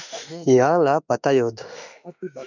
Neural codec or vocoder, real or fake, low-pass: autoencoder, 48 kHz, 32 numbers a frame, DAC-VAE, trained on Japanese speech; fake; 7.2 kHz